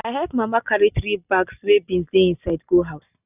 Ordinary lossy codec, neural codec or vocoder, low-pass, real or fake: none; none; 3.6 kHz; real